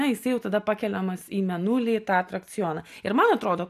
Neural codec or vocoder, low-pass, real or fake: none; 14.4 kHz; real